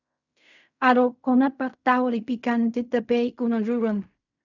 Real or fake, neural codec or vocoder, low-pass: fake; codec, 16 kHz in and 24 kHz out, 0.4 kbps, LongCat-Audio-Codec, fine tuned four codebook decoder; 7.2 kHz